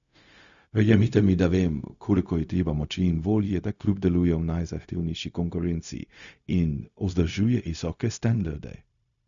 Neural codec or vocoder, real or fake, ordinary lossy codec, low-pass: codec, 16 kHz, 0.4 kbps, LongCat-Audio-Codec; fake; none; 7.2 kHz